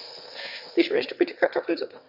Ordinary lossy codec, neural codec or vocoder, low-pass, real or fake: none; autoencoder, 22.05 kHz, a latent of 192 numbers a frame, VITS, trained on one speaker; 5.4 kHz; fake